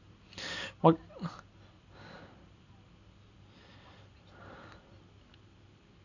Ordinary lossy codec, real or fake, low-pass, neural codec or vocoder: none; real; 7.2 kHz; none